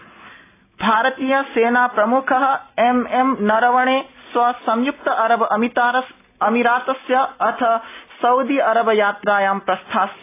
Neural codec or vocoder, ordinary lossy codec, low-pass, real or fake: none; AAC, 24 kbps; 3.6 kHz; real